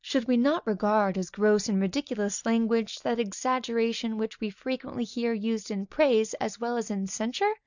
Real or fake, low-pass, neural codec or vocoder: real; 7.2 kHz; none